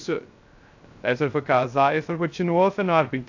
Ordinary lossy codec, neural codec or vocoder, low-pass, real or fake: AAC, 48 kbps; codec, 16 kHz, 0.3 kbps, FocalCodec; 7.2 kHz; fake